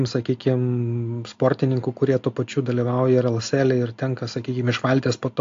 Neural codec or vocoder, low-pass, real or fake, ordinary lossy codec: none; 7.2 kHz; real; AAC, 48 kbps